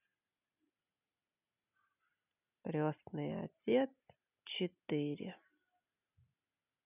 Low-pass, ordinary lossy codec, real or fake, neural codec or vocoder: 3.6 kHz; none; real; none